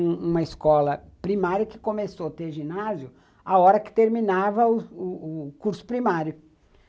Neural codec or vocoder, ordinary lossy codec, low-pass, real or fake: none; none; none; real